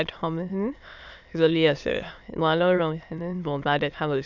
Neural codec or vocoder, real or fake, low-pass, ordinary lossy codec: autoencoder, 22.05 kHz, a latent of 192 numbers a frame, VITS, trained on many speakers; fake; 7.2 kHz; none